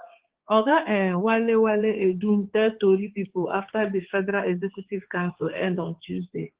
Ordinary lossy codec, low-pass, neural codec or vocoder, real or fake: Opus, 16 kbps; 3.6 kHz; codec, 16 kHz, 4 kbps, X-Codec, HuBERT features, trained on balanced general audio; fake